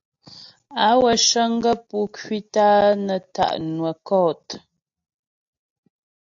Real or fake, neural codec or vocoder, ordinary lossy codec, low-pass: real; none; AAC, 64 kbps; 7.2 kHz